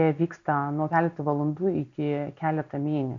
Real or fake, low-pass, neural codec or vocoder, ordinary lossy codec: real; 7.2 kHz; none; MP3, 64 kbps